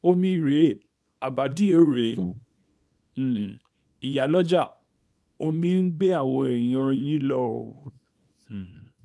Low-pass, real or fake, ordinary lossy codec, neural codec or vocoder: none; fake; none; codec, 24 kHz, 0.9 kbps, WavTokenizer, small release